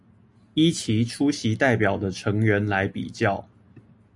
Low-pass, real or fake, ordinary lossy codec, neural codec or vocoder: 10.8 kHz; real; MP3, 96 kbps; none